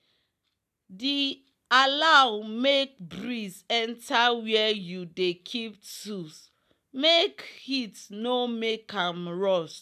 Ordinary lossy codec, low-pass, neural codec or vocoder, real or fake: none; 14.4 kHz; none; real